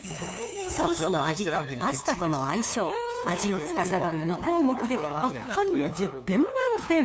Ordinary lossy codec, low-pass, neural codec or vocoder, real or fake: none; none; codec, 16 kHz, 2 kbps, FunCodec, trained on LibriTTS, 25 frames a second; fake